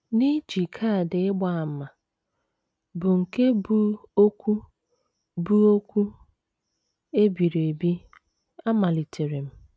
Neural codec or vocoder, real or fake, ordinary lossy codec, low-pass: none; real; none; none